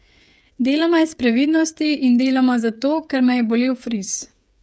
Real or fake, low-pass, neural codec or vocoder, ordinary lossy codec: fake; none; codec, 16 kHz, 8 kbps, FreqCodec, smaller model; none